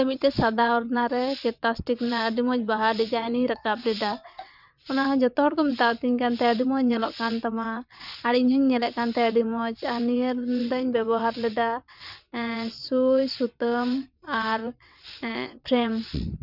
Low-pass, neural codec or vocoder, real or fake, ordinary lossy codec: 5.4 kHz; vocoder, 22.05 kHz, 80 mel bands, WaveNeXt; fake; none